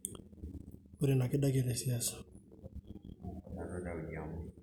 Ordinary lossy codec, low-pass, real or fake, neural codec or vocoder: none; 19.8 kHz; real; none